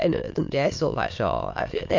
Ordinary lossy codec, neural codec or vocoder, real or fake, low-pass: MP3, 48 kbps; autoencoder, 22.05 kHz, a latent of 192 numbers a frame, VITS, trained on many speakers; fake; 7.2 kHz